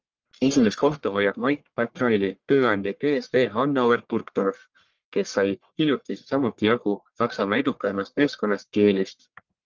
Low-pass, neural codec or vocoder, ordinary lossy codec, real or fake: 7.2 kHz; codec, 44.1 kHz, 1.7 kbps, Pupu-Codec; Opus, 24 kbps; fake